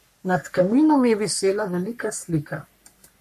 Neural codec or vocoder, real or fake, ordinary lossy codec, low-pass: codec, 44.1 kHz, 3.4 kbps, Pupu-Codec; fake; MP3, 64 kbps; 14.4 kHz